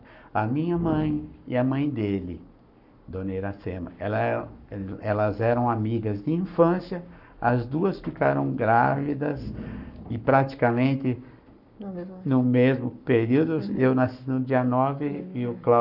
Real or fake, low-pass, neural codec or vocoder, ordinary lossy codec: fake; 5.4 kHz; codec, 44.1 kHz, 7.8 kbps, Pupu-Codec; none